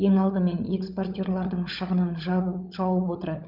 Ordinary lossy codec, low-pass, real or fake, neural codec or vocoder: none; 5.4 kHz; fake; codec, 16 kHz, 16 kbps, FunCodec, trained on LibriTTS, 50 frames a second